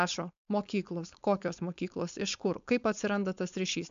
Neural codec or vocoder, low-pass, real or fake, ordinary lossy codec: codec, 16 kHz, 4.8 kbps, FACodec; 7.2 kHz; fake; MP3, 64 kbps